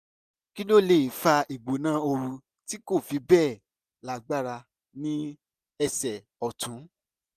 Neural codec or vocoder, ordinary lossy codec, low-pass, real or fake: none; none; 14.4 kHz; real